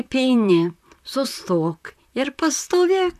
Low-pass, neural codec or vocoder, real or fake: 14.4 kHz; vocoder, 44.1 kHz, 128 mel bands, Pupu-Vocoder; fake